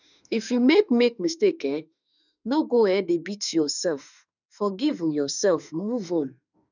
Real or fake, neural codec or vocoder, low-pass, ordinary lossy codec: fake; autoencoder, 48 kHz, 32 numbers a frame, DAC-VAE, trained on Japanese speech; 7.2 kHz; none